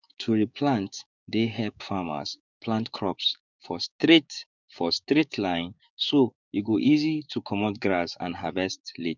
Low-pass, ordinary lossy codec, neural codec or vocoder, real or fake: 7.2 kHz; none; codec, 16 kHz, 6 kbps, DAC; fake